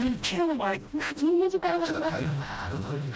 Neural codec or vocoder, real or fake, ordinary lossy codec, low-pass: codec, 16 kHz, 0.5 kbps, FreqCodec, smaller model; fake; none; none